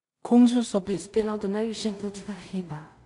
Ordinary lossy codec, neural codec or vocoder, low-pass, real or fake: none; codec, 16 kHz in and 24 kHz out, 0.4 kbps, LongCat-Audio-Codec, two codebook decoder; 10.8 kHz; fake